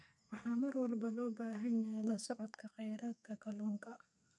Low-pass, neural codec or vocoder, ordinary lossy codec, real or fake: 10.8 kHz; codec, 32 kHz, 1.9 kbps, SNAC; none; fake